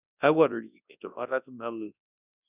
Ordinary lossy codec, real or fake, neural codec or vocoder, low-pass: none; fake; codec, 24 kHz, 0.9 kbps, WavTokenizer, large speech release; 3.6 kHz